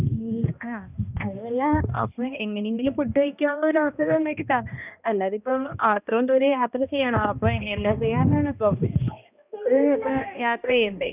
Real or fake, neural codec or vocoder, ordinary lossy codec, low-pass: fake; codec, 16 kHz, 1 kbps, X-Codec, HuBERT features, trained on balanced general audio; none; 3.6 kHz